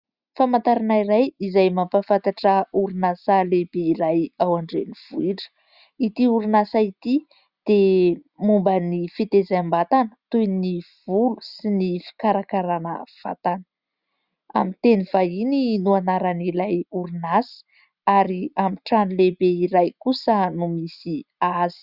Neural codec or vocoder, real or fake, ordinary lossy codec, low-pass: none; real; Opus, 64 kbps; 5.4 kHz